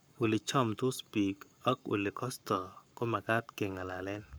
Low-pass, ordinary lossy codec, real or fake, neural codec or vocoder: none; none; fake; codec, 44.1 kHz, 7.8 kbps, Pupu-Codec